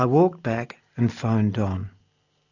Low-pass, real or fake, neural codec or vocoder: 7.2 kHz; real; none